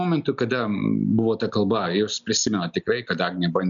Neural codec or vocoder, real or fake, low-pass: none; real; 7.2 kHz